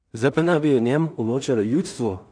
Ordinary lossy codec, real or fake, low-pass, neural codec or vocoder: none; fake; 9.9 kHz; codec, 16 kHz in and 24 kHz out, 0.4 kbps, LongCat-Audio-Codec, two codebook decoder